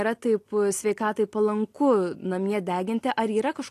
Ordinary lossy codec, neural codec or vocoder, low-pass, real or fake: AAC, 48 kbps; none; 14.4 kHz; real